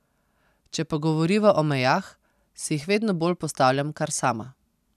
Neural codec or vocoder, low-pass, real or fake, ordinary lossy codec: none; 14.4 kHz; real; none